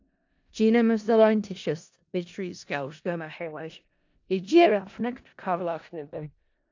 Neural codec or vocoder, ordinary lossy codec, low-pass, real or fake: codec, 16 kHz in and 24 kHz out, 0.4 kbps, LongCat-Audio-Codec, four codebook decoder; AAC, 48 kbps; 7.2 kHz; fake